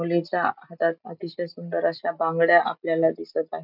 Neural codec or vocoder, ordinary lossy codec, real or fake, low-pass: none; none; real; 5.4 kHz